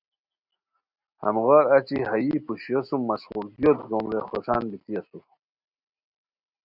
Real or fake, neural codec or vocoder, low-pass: real; none; 5.4 kHz